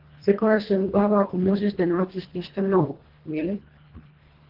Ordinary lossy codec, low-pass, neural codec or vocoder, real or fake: Opus, 16 kbps; 5.4 kHz; codec, 24 kHz, 1.5 kbps, HILCodec; fake